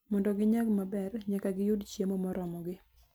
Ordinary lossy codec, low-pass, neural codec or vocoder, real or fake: none; none; none; real